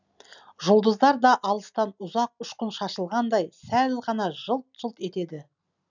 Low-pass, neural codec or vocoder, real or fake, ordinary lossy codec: 7.2 kHz; none; real; none